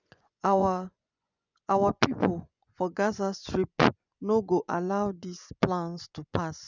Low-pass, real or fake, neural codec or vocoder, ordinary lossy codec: 7.2 kHz; fake; vocoder, 24 kHz, 100 mel bands, Vocos; none